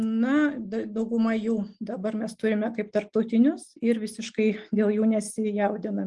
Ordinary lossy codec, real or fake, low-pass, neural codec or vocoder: Opus, 32 kbps; real; 10.8 kHz; none